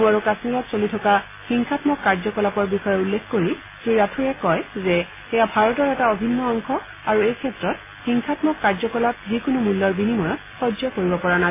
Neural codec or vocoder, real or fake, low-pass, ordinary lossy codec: none; real; 3.6 kHz; MP3, 16 kbps